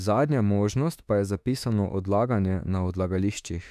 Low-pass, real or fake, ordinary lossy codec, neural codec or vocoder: 14.4 kHz; fake; none; autoencoder, 48 kHz, 32 numbers a frame, DAC-VAE, trained on Japanese speech